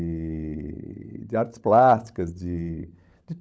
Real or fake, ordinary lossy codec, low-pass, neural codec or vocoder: fake; none; none; codec, 16 kHz, 16 kbps, FunCodec, trained on LibriTTS, 50 frames a second